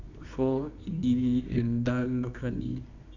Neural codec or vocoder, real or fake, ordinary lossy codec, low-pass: codec, 24 kHz, 0.9 kbps, WavTokenizer, medium music audio release; fake; none; 7.2 kHz